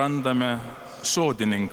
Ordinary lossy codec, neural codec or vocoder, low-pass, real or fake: Opus, 16 kbps; none; 19.8 kHz; real